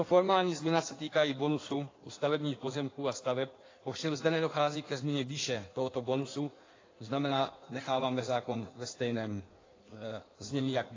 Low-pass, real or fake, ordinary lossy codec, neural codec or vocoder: 7.2 kHz; fake; AAC, 32 kbps; codec, 16 kHz in and 24 kHz out, 1.1 kbps, FireRedTTS-2 codec